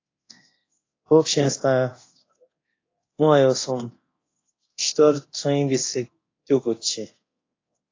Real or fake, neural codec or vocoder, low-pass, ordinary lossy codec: fake; codec, 24 kHz, 0.9 kbps, DualCodec; 7.2 kHz; AAC, 32 kbps